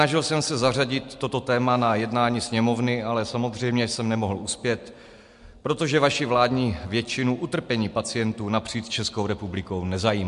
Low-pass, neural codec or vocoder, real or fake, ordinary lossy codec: 10.8 kHz; none; real; MP3, 64 kbps